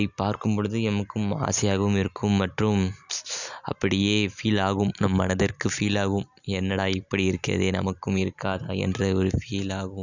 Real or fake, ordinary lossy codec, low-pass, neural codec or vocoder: real; none; 7.2 kHz; none